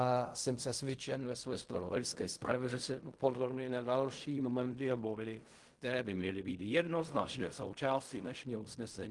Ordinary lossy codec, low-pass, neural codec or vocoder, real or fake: Opus, 24 kbps; 10.8 kHz; codec, 16 kHz in and 24 kHz out, 0.4 kbps, LongCat-Audio-Codec, fine tuned four codebook decoder; fake